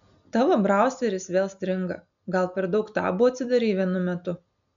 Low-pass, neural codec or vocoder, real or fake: 7.2 kHz; none; real